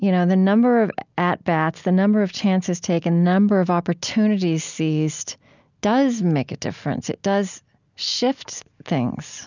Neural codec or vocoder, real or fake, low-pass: none; real; 7.2 kHz